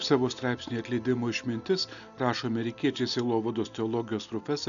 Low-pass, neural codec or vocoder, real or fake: 7.2 kHz; none; real